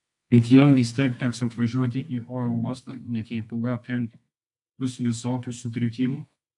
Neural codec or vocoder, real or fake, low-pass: codec, 24 kHz, 0.9 kbps, WavTokenizer, medium music audio release; fake; 10.8 kHz